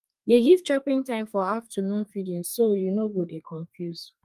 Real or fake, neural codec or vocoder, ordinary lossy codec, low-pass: fake; codec, 32 kHz, 1.9 kbps, SNAC; Opus, 32 kbps; 14.4 kHz